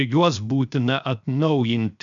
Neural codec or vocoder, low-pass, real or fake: codec, 16 kHz, 0.7 kbps, FocalCodec; 7.2 kHz; fake